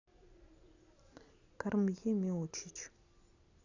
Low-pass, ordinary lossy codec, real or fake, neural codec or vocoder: 7.2 kHz; none; real; none